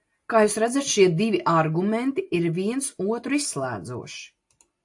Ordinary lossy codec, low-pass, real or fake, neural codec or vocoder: AAC, 64 kbps; 10.8 kHz; real; none